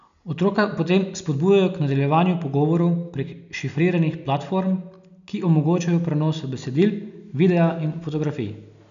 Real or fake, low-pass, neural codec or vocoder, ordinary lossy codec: real; 7.2 kHz; none; none